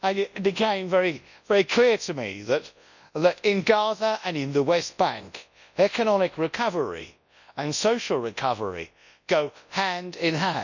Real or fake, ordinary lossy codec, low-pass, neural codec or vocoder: fake; none; 7.2 kHz; codec, 24 kHz, 0.9 kbps, WavTokenizer, large speech release